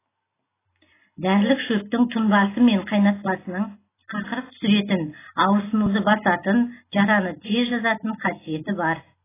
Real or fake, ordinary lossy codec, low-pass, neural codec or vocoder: real; AAC, 16 kbps; 3.6 kHz; none